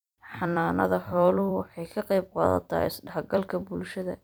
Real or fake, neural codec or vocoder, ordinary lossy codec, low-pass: fake; vocoder, 44.1 kHz, 128 mel bands every 256 samples, BigVGAN v2; none; none